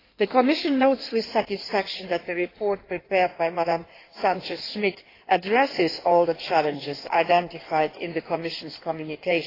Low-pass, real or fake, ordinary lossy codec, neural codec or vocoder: 5.4 kHz; fake; AAC, 24 kbps; codec, 16 kHz in and 24 kHz out, 1.1 kbps, FireRedTTS-2 codec